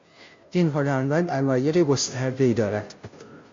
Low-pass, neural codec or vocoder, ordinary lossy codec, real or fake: 7.2 kHz; codec, 16 kHz, 0.5 kbps, FunCodec, trained on Chinese and English, 25 frames a second; MP3, 48 kbps; fake